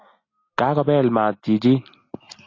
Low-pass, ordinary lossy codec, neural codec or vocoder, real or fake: 7.2 kHz; AAC, 32 kbps; none; real